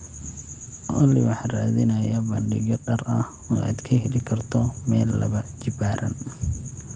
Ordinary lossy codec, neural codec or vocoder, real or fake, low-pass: Opus, 32 kbps; none; real; 9.9 kHz